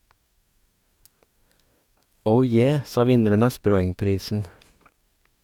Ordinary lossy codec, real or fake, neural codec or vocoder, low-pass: none; fake; codec, 44.1 kHz, 2.6 kbps, DAC; 19.8 kHz